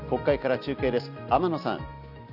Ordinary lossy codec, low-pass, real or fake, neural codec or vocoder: none; 5.4 kHz; real; none